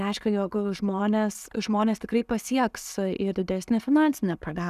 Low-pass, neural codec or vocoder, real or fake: 14.4 kHz; none; real